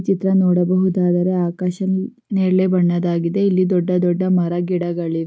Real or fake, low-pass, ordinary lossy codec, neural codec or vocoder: real; none; none; none